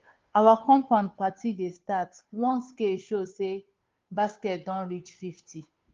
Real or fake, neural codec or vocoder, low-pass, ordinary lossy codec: fake; codec, 16 kHz, 2 kbps, FunCodec, trained on Chinese and English, 25 frames a second; 7.2 kHz; Opus, 32 kbps